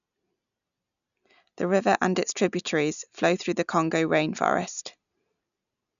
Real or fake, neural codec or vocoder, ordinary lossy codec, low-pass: real; none; none; 7.2 kHz